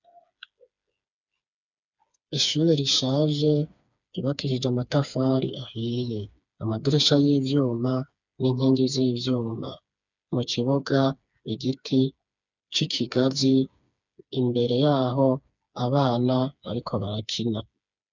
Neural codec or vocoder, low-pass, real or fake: codec, 16 kHz, 4 kbps, FreqCodec, smaller model; 7.2 kHz; fake